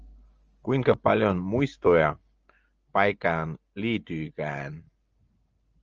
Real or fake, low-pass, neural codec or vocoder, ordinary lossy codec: real; 7.2 kHz; none; Opus, 24 kbps